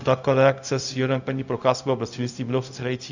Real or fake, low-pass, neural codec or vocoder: fake; 7.2 kHz; codec, 16 kHz, 0.4 kbps, LongCat-Audio-Codec